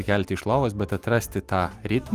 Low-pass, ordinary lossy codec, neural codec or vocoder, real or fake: 14.4 kHz; Opus, 32 kbps; none; real